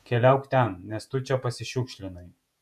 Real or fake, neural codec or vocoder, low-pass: fake; vocoder, 48 kHz, 128 mel bands, Vocos; 14.4 kHz